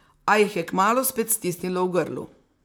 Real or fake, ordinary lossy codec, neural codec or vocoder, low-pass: fake; none; vocoder, 44.1 kHz, 128 mel bands, Pupu-Vocoder; none